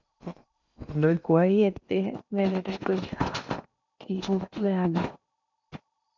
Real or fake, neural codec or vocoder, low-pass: fake; codec, 16 kHz in and 24 kHz out, 0.8 kbps, FocalCodec, streaming, 65536 codes; 7.2 kHz